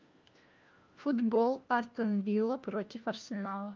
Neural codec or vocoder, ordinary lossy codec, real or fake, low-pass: codec, 16 kHz, 1 kbps, FunCodec, trained on LibriTTS, 50 frames a second; Opus, 24 kbps; fake; 7.2 kHz